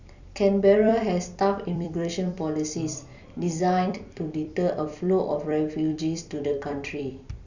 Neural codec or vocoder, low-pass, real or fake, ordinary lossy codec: vocoder, 44.1 kHz, 128 mel bands every 256 samples, BigVGAN v2; 7.2 kHz; fake; none